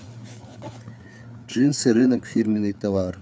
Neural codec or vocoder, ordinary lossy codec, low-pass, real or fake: codec, 16 kHz, 4 kbps, FreqCodec, larger model; none; none; fake